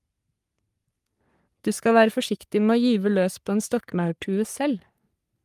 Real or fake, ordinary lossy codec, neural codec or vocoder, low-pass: fake; Opus, 32 kbps; codec, 44.1 kHz, 3.4 kbps, Pupu-Codec; 14.4 kHz